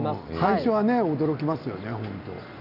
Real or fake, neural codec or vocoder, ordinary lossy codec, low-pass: real; none; none; 5.4 kHz